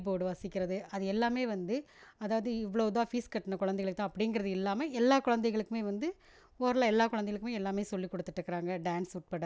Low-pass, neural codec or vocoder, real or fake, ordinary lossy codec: none; none; real; none